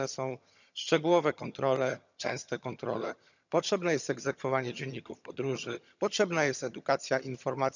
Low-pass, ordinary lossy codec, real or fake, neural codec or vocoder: 7.2 kHz; none; fake; vocoder, 22.05 kHz, 80 mel bands, HiFi-GAN